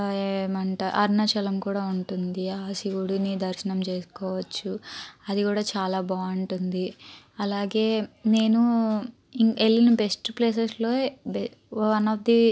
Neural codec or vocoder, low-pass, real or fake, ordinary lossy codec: none; none; real; none